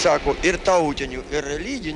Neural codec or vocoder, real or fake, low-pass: none; real; 10.8 kHz